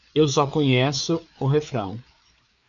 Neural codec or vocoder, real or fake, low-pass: codec, 16 kHz, 4 kbps, FreqCodec, larger model; fake; 7.2 kHz